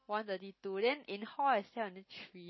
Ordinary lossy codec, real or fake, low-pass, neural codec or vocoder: MP3, 24 kbps; real; 7.2 kHz; none